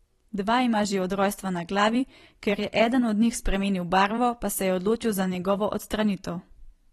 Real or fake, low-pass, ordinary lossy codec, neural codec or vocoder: fake; 19.8 kHz; AAC, 32 kbps; vocoder, 44.1 kHz, 128 mel bands every 256 samples, BigVGAN v2